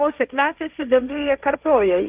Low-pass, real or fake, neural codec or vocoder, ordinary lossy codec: 3.6 kHz; fake; codec, 16 kHz, 1.1 kbps, Voila-Tokenizer; Opus, 24 kbps